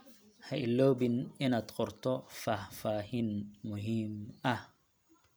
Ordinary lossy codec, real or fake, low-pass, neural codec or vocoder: none; real; none; none